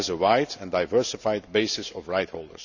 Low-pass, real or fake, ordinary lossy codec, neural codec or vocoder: 7.2 kHz; real; none; none